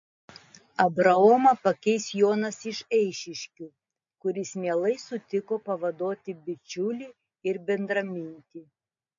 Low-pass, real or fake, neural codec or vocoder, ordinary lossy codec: 7.2 kHz; real; none; MP3, 48 kbps